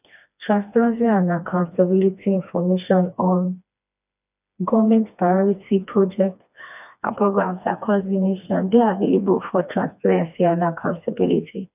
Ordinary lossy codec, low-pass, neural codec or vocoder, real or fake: none; 3.6 kHz; codec, 16 kHz, 2 kbps, FreqCodec, smaller model; fake